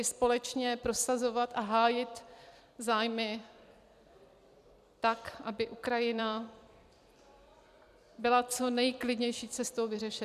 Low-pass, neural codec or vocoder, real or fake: 14.4 kHz; vocoder, 44.1 kHz, 128 mel bands every 256 samples, BigVGAN v2; fake